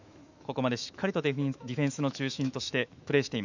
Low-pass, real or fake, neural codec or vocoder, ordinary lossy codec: 7.2 kHz; fake; autoencoder, 48 kHz, 128 numbers a frame, DAC-VAE, trained on Japanese speech; none